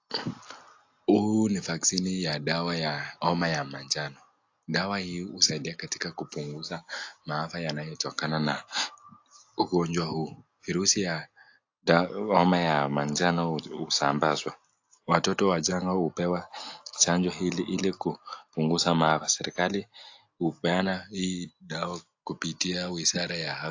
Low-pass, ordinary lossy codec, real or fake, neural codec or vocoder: 7.2 kHz; AAC, 48 kbps; real; none